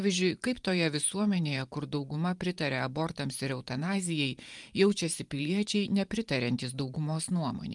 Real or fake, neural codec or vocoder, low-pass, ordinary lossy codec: fake; vocoder, 44.1 kHz, 128 mel bands every 512 samples, BigVGAN v2; 10.8 kHz; Opus, 32 kbps